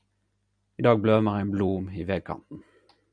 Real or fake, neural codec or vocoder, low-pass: real; none; 9.9 kHz